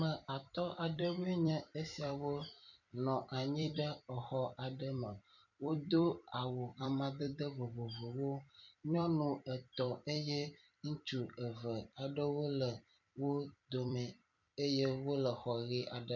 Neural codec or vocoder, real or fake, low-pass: vocoder, 44.1 kHz, 128 mel bands every 256 samples, BigVGAN v2; fake; 7.2 kHz